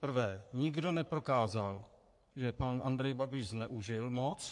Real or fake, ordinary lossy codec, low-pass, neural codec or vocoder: fake; MP3, 64 kbps; 10.8 kHz; codec, 44.1 kHz, 3.4 kbps, Pupu-Codec